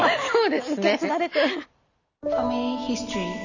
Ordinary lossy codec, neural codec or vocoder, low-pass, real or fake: none; none; 7.2 kHz; real